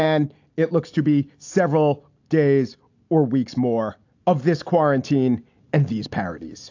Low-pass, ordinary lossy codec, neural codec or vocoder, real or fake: 7.2 kHz; AAC, 48 kbps; none; real